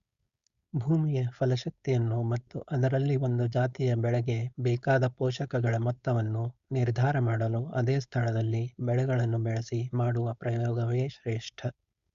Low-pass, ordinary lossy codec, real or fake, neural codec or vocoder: 7.2 kHz; Opus, 64 kbps; fake; codec, 16 kHz, 4.8 kbps, FACodec